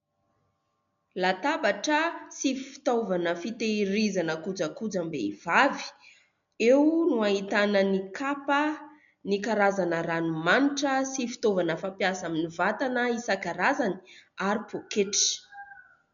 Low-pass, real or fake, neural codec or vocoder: 7.2 kHz; real; none